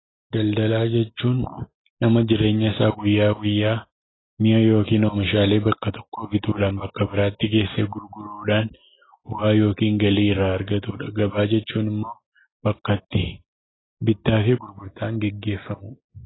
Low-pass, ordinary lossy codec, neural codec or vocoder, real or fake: 7.2 kHz; AAC, 16 kbps; none; real